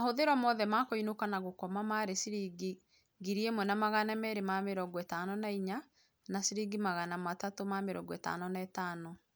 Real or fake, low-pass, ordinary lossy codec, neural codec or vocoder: real; none; none; none